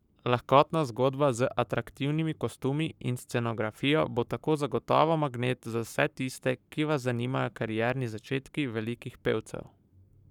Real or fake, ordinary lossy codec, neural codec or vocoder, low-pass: fake; none; codec, 44.1 kHz, 7.8 kbps, Pupu-Codec; 19.8 kHz